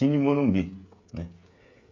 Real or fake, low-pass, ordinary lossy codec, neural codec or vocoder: fake; 7.2 kHz; MP3, 48 kbps; codec, 16 kHz, 8 kbps, FreqCodec, smaller model